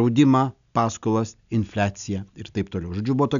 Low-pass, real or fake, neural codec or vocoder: 7.2 kHz; real; none